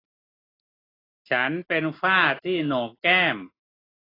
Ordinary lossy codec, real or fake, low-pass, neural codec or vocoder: AAC, 24 kbps; real; 5.4 kHz; none